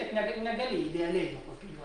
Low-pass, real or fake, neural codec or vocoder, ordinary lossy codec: 9.9 kHz; real; none; Opus, 32 kbps